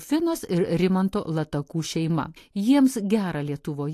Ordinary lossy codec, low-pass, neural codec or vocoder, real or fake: AAC, 64 kbps; 14.4 kHz; none; real